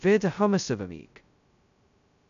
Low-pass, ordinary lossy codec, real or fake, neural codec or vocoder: 7.2 kHz; none; fake; codec, 16 kHz, 0.2 kbps, FocalCodec